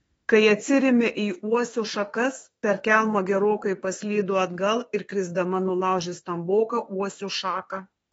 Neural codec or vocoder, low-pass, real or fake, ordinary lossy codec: autoencoder, 48 kHz, 32 numbers a frame, DAC-VAE, trained on Japanese speech; 19.8 kHz; fake; AAC, 24 kbps